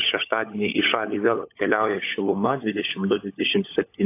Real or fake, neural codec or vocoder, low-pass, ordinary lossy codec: fake; codec, 16 kHz, 16 kbps, FunCodec, trained on LibriTTS, 50 frames a second; 3.6 kHz; AAC, 24 kbps